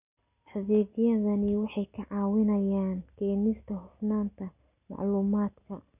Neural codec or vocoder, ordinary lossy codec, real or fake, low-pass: none; none; real; 3.6 kHz